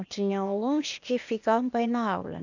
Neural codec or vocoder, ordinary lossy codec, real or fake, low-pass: codec, 16 kHz, 0.8 kbps, ZipCodec; none; fake; 7.2 kHz